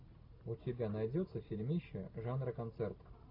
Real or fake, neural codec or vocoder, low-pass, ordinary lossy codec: real; none; 5.4 kHz; AAC, 48 kbps